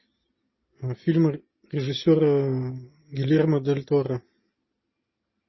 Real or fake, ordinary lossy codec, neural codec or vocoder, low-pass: real; MP3, 24 kbps; none; 7.2 kHz